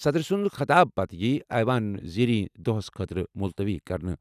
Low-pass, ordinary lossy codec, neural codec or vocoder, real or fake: 14.4 kHz; none; none; real